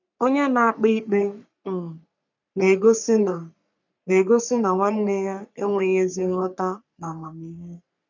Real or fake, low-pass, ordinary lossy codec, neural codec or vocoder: fake; 7.2 kHz; none; codec, 44.1 kHz, 3.4 kbps, Pupu-Codec